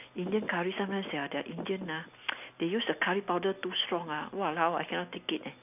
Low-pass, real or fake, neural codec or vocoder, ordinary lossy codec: 3.6 kHz; real; none; none